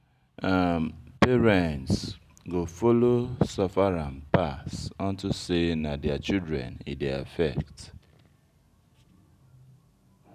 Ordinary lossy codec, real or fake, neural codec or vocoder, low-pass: none; real; none; 14.4 kHz